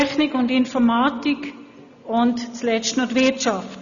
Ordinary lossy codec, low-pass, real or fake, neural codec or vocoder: none; 7.2 kHz; real; none